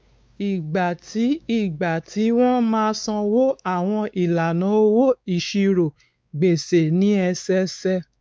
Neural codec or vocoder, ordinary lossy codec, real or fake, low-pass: codec, 16 kHz, 4 kbps, X-Codec, WavLM features, trained on Multilingual LibriSpeech; none; fake; none